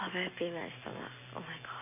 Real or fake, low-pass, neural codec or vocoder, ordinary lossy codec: real; 3.6 kHz; none; MP3, 32 kbps